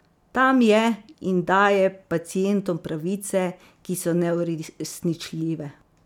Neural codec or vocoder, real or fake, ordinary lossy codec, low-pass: none; real; none; 19.8 kHz